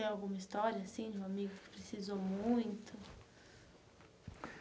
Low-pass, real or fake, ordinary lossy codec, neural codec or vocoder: none; real; none; none